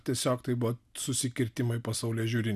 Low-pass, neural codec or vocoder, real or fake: 14.4 kHz; none; real